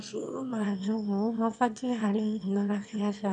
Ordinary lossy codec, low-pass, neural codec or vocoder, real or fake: none; 9.9 kHz; autoencoder, 22.05 kHz, a latent of 192 numbers a frame, VITS, trained on one speaker; fake